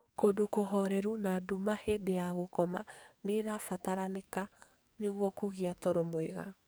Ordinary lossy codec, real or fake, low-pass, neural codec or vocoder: none; fake; none; codec, 44.1 kHz, 2.6 kbps, SNAC